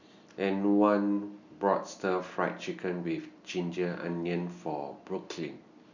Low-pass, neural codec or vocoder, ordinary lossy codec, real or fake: 7.2 kHz; none; none; real